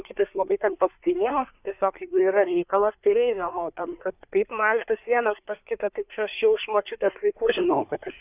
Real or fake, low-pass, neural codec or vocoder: fake; 3.6 kHz; codec, 24 kHz, 1 kbps, SNAC